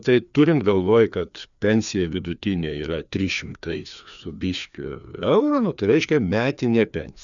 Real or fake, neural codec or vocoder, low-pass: fake; codec, 16 kHz, 2 kbps, FreqCodec, larger model; 7.2 kHz